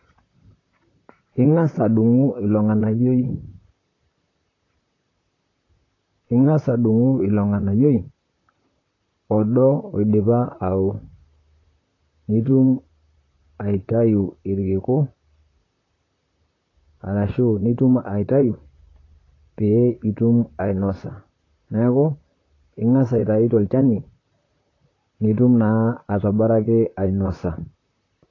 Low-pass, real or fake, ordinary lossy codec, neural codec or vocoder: 7.2 kHz; fake; AAC, 32 kbps; vocoder, 44.1 kHz, 128 mel bands, Pupu-Vocoder